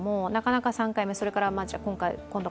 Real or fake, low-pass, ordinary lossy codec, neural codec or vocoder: real; none; none; none